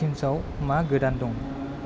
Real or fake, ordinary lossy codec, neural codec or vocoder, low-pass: real; none; none; none